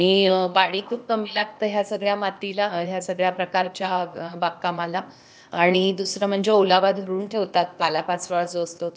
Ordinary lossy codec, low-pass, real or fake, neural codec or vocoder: none; none; fake; codec, 16 kHz, 0.8 kbps, ZipCodec